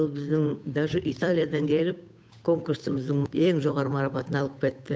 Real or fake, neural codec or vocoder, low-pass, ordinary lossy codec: fake; codec, 16 kHz, 8 kbps, FunCodec, trained on Chinese and English, 25 frames a second; none; none